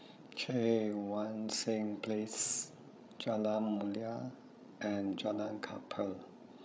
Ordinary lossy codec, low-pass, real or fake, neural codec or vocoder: none; none; fake; codec, 16 kHz, 16 kbps, FreqCodec, larger model